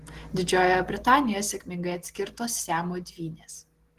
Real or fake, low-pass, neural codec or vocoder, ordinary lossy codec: real; 14.4 kHz; none; Opus, 16 kbps